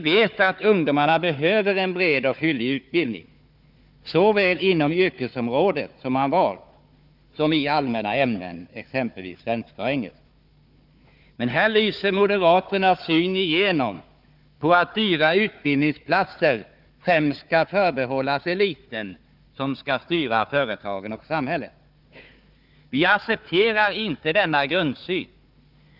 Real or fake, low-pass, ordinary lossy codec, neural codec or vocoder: fake; 5.4 kHz; none; codec, 16 kHz, 4 kbps, FunCodec, trained on Chinese and English, 50 frames a second